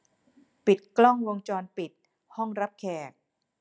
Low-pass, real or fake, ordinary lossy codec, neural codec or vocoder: none; real; none; none